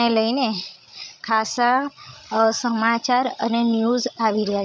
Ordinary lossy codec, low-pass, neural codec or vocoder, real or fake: none; none; codec, 16 kHz, 16 kbps, FreqCodec, larger model; fake